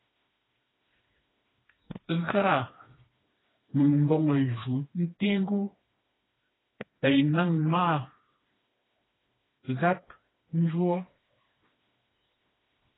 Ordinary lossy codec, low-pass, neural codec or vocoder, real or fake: AAC, 16 kbps; 7.2 kHz; codec, 16 kHz, 2 kbps, FreqCodec, smaller model; fake